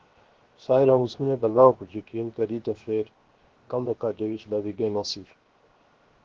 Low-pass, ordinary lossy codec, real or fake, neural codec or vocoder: 7.2 kHz; Opus, 16 kbps; fake; codec, 16 kHz, 0.7 kbps, FocalCodec